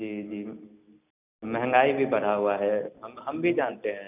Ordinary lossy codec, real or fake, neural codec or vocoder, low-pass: none; real; none; 3.6 kHz